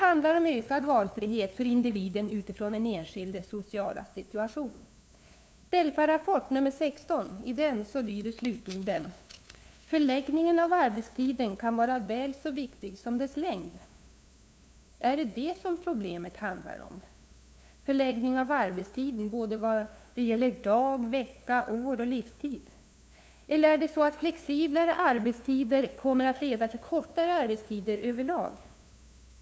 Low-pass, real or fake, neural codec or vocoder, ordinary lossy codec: none; fake; codec, 16 kHz, 2 kbps, FunCodec, trained on LibriTTS, 25 frames a second; none